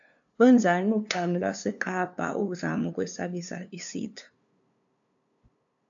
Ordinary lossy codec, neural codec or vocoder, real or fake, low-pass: AAC, 64 kbps; codec, 16 kHz, 2 kbps, FunCodec, trained on LibriTTS, 25 frames a second; fake; 7.2 kHz